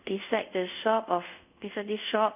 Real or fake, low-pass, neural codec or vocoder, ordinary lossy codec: fake; 3.6 kHz; codec, 24 kHz, 0.5 kbps, DualCodec; none